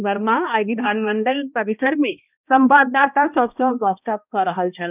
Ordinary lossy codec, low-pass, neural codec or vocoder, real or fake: none; 3.6 kHz; codec, 16 kHz, 1 kbps, X-Codec, HuBERT features, trained on balanced general audio; fake